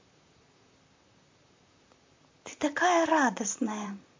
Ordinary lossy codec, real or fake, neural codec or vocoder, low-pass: MP3, 48 kbps; fake; vocoder, 44.1 kHz, 128 mel bands, Pupu-Vocoder; 7.2 kHz